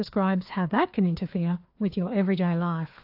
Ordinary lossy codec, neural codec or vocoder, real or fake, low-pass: AAC, 48 kbps; codec, 16 kHz, 2 kbps, FreqCodec, larger model; fake; 5.4 kHz